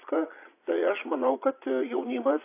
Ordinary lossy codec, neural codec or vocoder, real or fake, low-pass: AAC, 24 kbps; vocoder, 22.05 kHz, 80 mel bands, Vocos; fake; 3.6 kHz